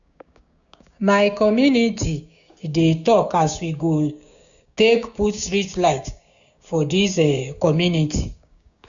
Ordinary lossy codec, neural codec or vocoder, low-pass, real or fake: none; codec, 16 kHz, 6 kbps, DAC; 7.2 kHz; fake